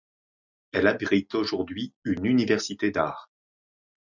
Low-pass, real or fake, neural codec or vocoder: 7.2 kHz; real; none